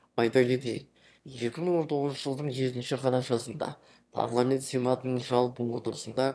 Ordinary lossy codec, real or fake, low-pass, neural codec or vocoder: none; fake; none; autoencoder, 22.05 kHz, a latent of 192 numbers a frame, VITS, trained on one speaker